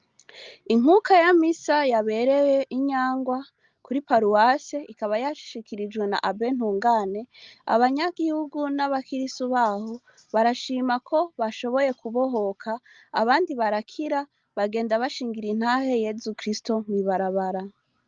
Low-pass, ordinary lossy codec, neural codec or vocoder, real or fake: 7.2 kHz; Opus, 32 kbps; none; real